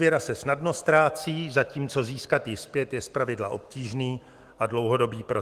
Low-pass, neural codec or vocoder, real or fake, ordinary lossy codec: 14.4 kHz; autoencoder, 48 kHz, 128 numbers a frame, DAC-VAE, trained on Japanese speech; fake; Opus, 24 kbps